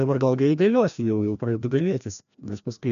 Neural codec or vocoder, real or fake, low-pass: codec, 16 kHz, 1 kbps, FreqCodec, larger model; fake; 7.2 kHz